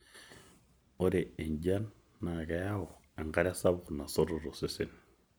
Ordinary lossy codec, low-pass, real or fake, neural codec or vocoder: none; none; real; none